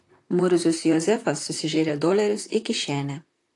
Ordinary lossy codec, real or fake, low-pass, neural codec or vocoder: AAC, 48 kbps; fake; 10.8 kHz; vocoder, 44.1 kHz, 128 mel bands, Pupu-Vocoder